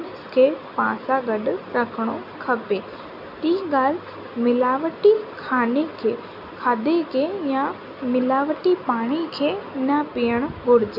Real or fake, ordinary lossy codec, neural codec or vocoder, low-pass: real; none; none; 5.4 kHz